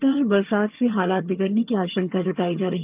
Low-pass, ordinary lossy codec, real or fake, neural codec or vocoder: 3.6 kHz; Opus, 32 kbps; fake; vocoder, 22.05 kHz, 80 mel bands, HiFi-GAN